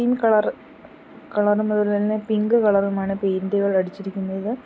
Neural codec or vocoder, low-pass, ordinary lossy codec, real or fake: none; none; none; real